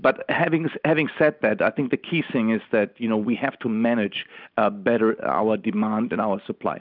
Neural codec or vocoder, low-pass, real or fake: none; 5.4 kHz; real